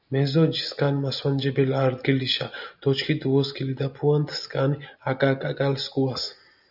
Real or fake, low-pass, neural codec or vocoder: real; 5.4 kHz; none